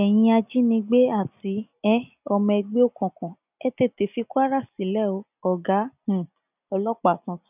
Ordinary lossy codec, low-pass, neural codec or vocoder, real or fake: none; 3.6 kHz; none; real